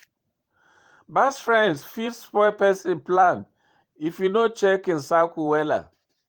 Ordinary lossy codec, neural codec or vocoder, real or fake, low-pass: Opus, 24 kbps; vocoder, 44.1 kHz, 128 mel bands every 512 samples, BigVGAN v2; fake; 19.8 kHz